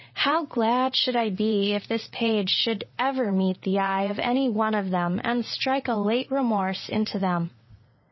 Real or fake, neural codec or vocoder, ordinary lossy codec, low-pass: fake; vocoder, 22.05 kHz, 80 mel bands, WaveNeXt; MP3, 24 kbps; 7.2 kHz